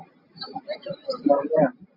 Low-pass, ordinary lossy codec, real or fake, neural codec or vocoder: 5.4 kHz; MP3, 32 kbps; real; none